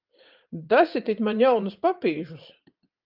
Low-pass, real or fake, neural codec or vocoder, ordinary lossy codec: 5.4 kHz; fake; vocoder, 44.1 kHz, 80 mel bands, Vocos; Opus, 24 kbps